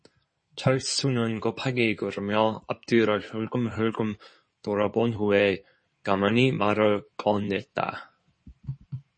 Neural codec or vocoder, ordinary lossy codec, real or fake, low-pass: codec, 16 kHz in and 24 kHz out, 2.2 kbps, FireRedTTS-2 codec; MP3, 32 kbps; fake; 9.9 kHz